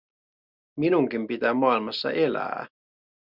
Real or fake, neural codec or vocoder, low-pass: real; none; 5.4 kHz